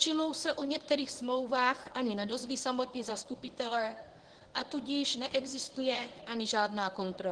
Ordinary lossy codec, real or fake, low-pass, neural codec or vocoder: Opus, 16 kbps; fake; 9.9 kHz; codec, 24 kHz, 0.9 kbps, WavTokenizer, medium speech release version 1